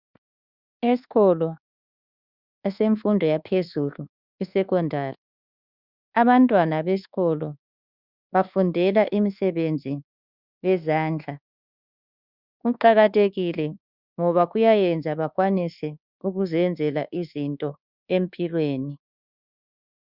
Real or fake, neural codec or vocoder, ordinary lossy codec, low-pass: fake; codec, 24 kHz, 1.2 kbps, DualCodec; Opus, 64 kbps; 5.4 kHz